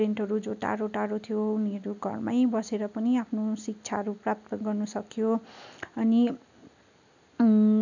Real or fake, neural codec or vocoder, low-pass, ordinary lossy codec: real; none; 7.2 kHz; none